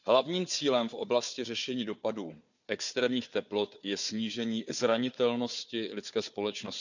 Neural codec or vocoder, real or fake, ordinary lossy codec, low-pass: codec, 16 kHz, 4 kbps, FunCodec, trained on Chinese and English, 50 frames a second; fake; none; 7.2 kHz